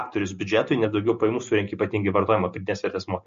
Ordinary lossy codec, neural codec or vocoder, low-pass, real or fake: MP3, 48 kbps; none; 7.2 kHz; real